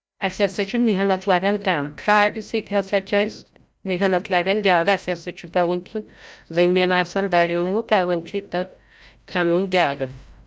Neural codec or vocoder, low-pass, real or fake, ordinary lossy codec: codec, 16 kHz, 0.5 kbps, FreqCodec, larger model; none; fake; none